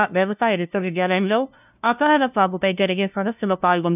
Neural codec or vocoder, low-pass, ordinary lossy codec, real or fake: codec, 16 kHz, 0.5 kbps, FunCodec, trained on LibriTTS, 25 frames a second; 3.6 kHz; none; fake